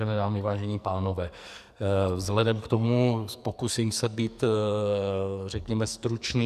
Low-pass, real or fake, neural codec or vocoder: 14.4 kHz; fake; codec, 44.1 kHz, 2.6 kbps, SNAC